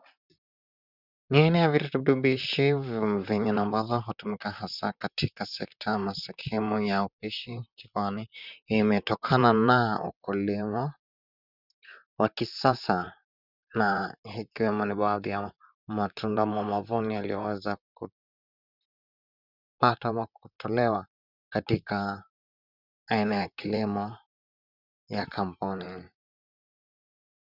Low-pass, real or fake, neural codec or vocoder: 5.4 kHz; fake; vocoder, 44.1 kHz, 128 mel bands, Pupu-Vocoder